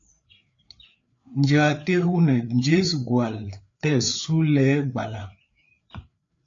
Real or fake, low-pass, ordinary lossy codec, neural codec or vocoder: fake; 7.2 kHz; AAC, 48 kbps; codec, 16 kHz, 4 kbps, FreqCodec, larger model